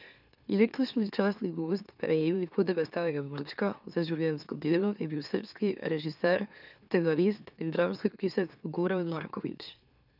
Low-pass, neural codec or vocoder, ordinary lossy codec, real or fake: 5.4 kHz; autoencoder, 44.1 kHz, a latent of 192 numbers a frame, MeloTTS; none; fake